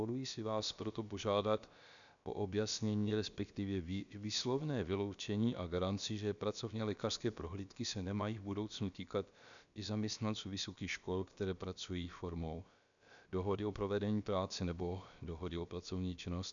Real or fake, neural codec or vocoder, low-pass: fake; codec, 16 kHz, about 1 kbps, DyCAST, with the encoder's durations; 7.2 kHz